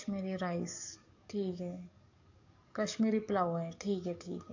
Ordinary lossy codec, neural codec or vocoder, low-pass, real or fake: AAC, 48 kbps; codec, 44.1 kHz, 7.8 kbps, DAC; 7.2 kHz; fake